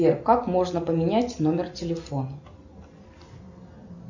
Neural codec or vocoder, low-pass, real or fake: none; 7.2 kHz; real